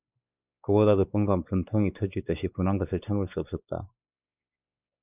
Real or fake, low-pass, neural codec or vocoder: fake; 3.6 kHz; codec, 16 kHz, 4 kbps, X-Codec, WavLM features, trained on Multilingual LibriSpeech